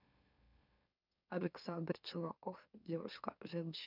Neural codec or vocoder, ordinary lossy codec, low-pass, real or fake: autoencoder, 44.1 kHz, a latent of 192 numbers a frame, MeloTTS; none; 5.4 kHz; fake